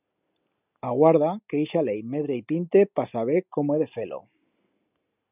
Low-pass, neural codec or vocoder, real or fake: 3.6 kHz; none; real